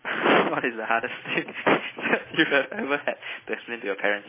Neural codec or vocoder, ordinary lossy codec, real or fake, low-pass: none; MP3, 16 kbps; real; 3.6 kHz